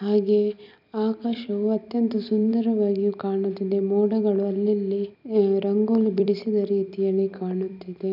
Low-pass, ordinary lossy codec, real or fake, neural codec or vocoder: 5.4 kHz; none; fake; vocoder, 44.1 kHz, 128 mel bands every 256 samples, BigVGAN v2